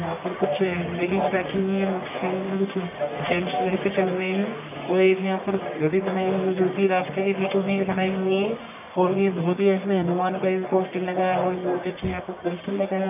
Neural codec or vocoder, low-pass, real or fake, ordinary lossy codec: codec, 44.1 kHz, 1.7 kbps, Pupu-Codec; 3.6 kHz; fake; none